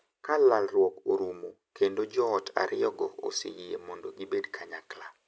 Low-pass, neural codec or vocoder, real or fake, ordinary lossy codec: none; none; real; none